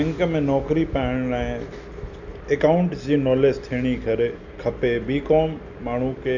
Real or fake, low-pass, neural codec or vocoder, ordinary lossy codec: real; 7.2 kHz; none; none